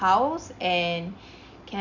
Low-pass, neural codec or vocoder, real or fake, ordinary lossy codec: 7.2 kHz; none; real; none